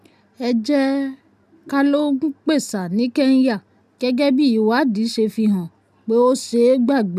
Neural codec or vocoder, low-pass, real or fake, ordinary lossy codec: none; 14.4 kHz; real; none